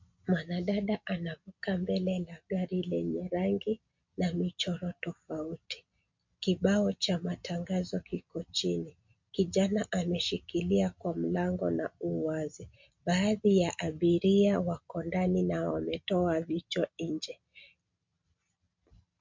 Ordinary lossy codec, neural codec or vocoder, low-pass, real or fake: MP3, 48 kbps; none; 7.2 kHz; real